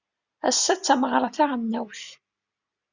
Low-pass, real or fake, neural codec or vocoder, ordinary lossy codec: 7.2 kHz; real; none; Opus, 64 kbps